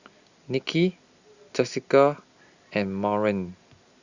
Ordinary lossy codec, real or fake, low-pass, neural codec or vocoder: Opus, 64 kbps; real; 7.2 kHz; none